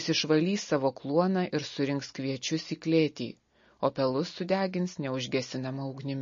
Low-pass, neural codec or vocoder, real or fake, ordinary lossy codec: 7.2 kHz; none; real; MP3, 32 kbps